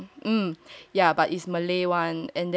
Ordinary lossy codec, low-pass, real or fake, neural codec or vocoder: none; none; real; none